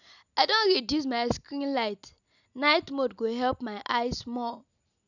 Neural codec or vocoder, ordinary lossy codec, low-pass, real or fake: none; none; 7.2 kHz; real